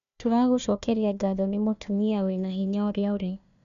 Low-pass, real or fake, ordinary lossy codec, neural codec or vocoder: 7.2 kHz; fake; none; codec, 16 kHz, 1 kbps, FunCodec, trained on Chinese and English, 50 frames a second